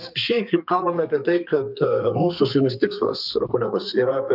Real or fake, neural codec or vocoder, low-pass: fake; codec, 32 kHz, 1.9 kbps, SNAC; 5.4 kHz